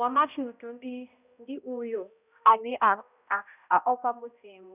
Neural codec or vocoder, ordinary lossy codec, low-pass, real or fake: codec, 16 kHz, 0.5 kbps, X-Codec, HuBERT features, trained on balanced general audio; none; 3.6 kHz; fake